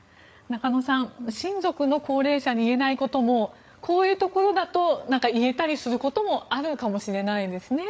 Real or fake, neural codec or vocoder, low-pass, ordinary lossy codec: fake; codec, 16 kHz, 8 kbps, FreqCodec, larger model; none; none